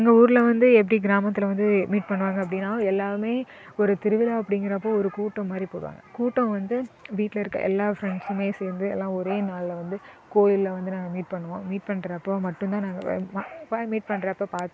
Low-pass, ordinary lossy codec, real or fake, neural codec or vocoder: none; none; real; none